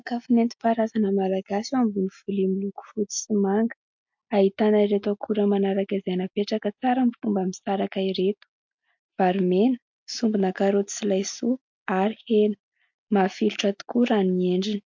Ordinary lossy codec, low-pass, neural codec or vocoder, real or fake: MP3, 48 kbps; 7.2 kHz; none; real